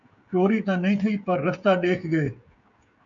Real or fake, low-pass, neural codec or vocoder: fake; 7.2 kHz; codec, 16 kHz, 16 kbps, FreqCodec, smaller model